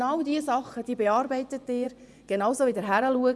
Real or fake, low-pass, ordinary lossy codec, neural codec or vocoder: fake; none; none; vocoder, 24 kHz, 100 mel bands, Vocos